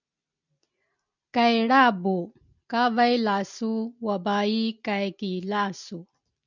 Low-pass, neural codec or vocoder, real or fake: 7.2 kHz; none; real